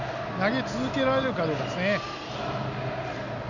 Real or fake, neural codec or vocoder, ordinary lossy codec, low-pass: real; none; none; 7.2 kHz